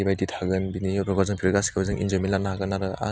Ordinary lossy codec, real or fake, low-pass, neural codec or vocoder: none; real; none; none